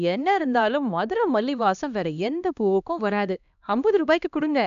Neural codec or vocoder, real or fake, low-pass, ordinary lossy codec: codec, 16 kHz, 1 kbps, X-Codec, HuBERT features, trained on LibriSpeech; fake; 7.2 kHz; none